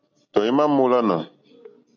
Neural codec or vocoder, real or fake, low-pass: none; real; 7.2 kHz